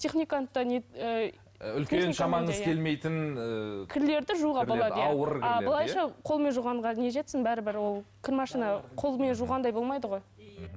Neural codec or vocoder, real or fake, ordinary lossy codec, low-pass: none; real; none; none